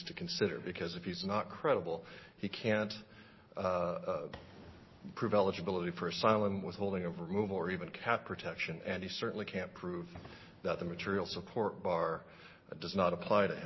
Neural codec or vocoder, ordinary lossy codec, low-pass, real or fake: none; MP3, 24 kbps; 7.2 kHz; real